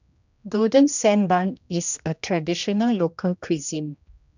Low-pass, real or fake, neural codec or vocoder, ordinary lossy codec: 7.2 kHz; fake; codec, 16 kHz, 1 kbps, X-Codec, HuBERT features, trained on general audio; none